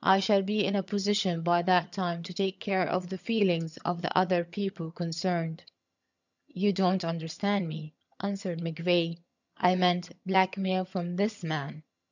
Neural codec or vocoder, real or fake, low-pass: vocoder, 22.05 kHz, 80 mel bands, HiFi-GAN; fake; 7.2 kHz